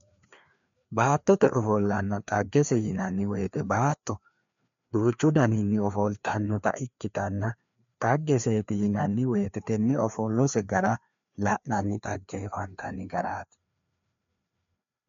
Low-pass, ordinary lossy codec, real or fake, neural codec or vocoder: 7.2 kHz; MP3, 64 kbps; fake; codec, 16 kHz, 2 kbps, FreqCodec, larger model